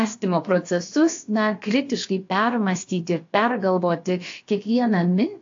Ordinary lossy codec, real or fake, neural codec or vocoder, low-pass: AAC, 48 kbps; fake; codec, 16 kHz, about 1 kbps, DyCAST, with the encoder's durations; 7.2 kHz